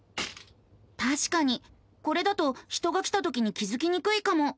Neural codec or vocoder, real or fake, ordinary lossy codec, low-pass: none; real; none; none